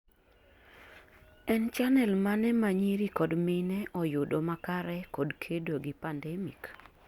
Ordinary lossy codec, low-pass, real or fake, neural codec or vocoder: Opus, 64 kbps; 19.8 kHz; real; none